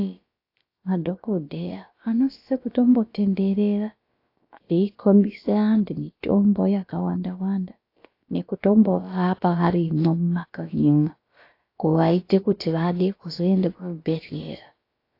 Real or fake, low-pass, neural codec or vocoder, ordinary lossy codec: fake; 5.4 kHz; codec, 16 kHz, about 1 kbps, DyCAST, with the encoder's durations; AAC, 32 kbps